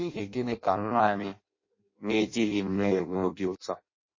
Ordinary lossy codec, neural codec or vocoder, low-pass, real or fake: MP3, 32 kbps; codec, 16 kHz in and 24 kHz out, 0.6 kbps, FireRedTTS-2 codec; 7.2 kHz; fake